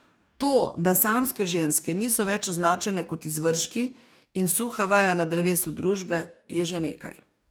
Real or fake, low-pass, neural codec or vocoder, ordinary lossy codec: fake; none; codec, 44.1 kHz, 2.6 kbps, DAC; none